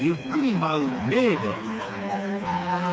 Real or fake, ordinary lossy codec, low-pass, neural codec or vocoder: fake; none; none; codec, 16 kHz, 2 kbps, FreqCodec, smaller model